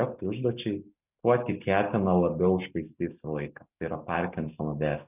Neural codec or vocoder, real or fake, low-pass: none; real; 3.6 kHz